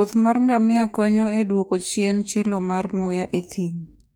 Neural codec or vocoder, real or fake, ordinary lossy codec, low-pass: codec, 44.1 kHz, 2.6 kbps, DAC; fake; none; none